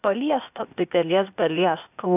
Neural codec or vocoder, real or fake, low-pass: codec, 16 kHz, 0.8 kbps, ZipCodec; fake; 3.6 kHz